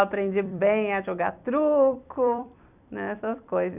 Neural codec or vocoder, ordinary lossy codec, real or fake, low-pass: vocoder, 44.1 kHz, 128 mel bands every 256 samples, BigVGAN v2; none; fake; 3.6 kHz